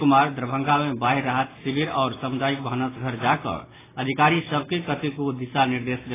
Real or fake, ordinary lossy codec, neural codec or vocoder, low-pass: real; AAC, 16 kbps; none; 3.6 kHz